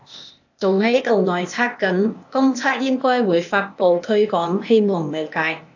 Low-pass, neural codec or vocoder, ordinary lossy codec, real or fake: 7.2 kHz; codec, 16 kHz, 0.8 kbps, ZipCodec; AAC, 48 kbps; fake